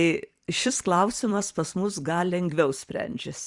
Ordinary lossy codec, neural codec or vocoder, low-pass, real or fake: Opus, 64 kbps; vocoder, 44.1 kHz, 128 mel bands every 256 samples, BigVGAN v2; 10.8 kHz; fake